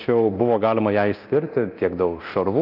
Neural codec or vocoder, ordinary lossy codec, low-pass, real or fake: codec, 24 kHz, 0.9 kbps, DualCodec; Opus, 32 kbps; 5.4 kHz; fake